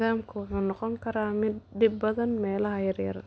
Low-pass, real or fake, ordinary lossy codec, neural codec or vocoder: none; real; none; none